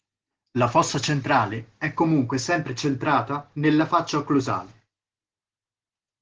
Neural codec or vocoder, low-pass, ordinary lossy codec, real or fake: none; 7.2 kHz; Opus, 16 kbps; real